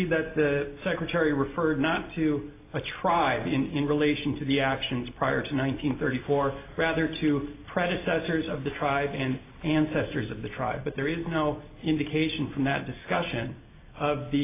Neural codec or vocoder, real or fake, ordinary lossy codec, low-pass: none; real; AAC, 24 kbps; 3.6 kHz